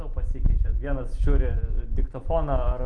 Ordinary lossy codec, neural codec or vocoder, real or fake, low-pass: Opus, 32 kbps; none; real; 9.9 kHz